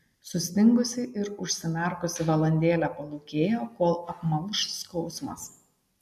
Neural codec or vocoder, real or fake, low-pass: none; real; 14.4 kHz